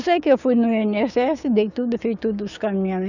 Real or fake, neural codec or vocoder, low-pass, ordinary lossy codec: fake; codec, 16 kHz, 6 kbps, DAC; 7.2 kHz; none